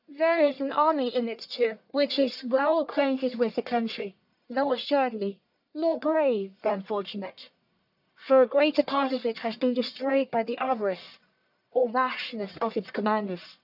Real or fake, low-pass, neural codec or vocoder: fake; 5.4 kHz; codec, 44.1 kHz, 1.7 kbps, Pupu-Codec